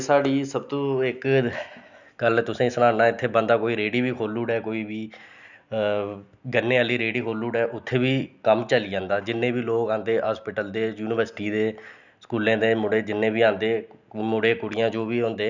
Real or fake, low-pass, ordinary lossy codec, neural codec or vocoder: real; 7.2 kHz; none; none